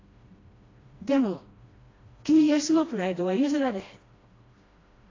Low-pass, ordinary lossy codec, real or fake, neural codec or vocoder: 7.2 kHz; AAC, 32 kbps; fake; codec, 16 kHz, 1 kbps, FreqCodec, smaller model